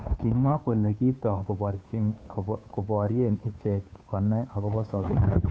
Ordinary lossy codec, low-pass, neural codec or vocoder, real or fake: none; none; codec, 16 kHz, 2 kbps, FunCodec, trained on Chinese and English, 25 frames a second; fake